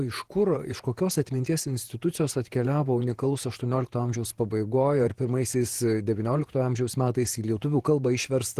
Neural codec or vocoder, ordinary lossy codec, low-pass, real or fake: vocoder, 48 kHz, 128 mel bands, Vocos; Opus, 16 kbps; 14.4 kHz; fake